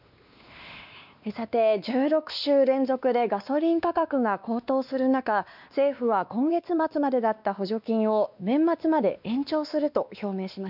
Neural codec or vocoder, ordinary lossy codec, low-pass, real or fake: codec, 16 kHz, 2 kbps, X-Codec, WavLM features, trained on Multilingual LibriSpeech; none; 5.4 kHz; fake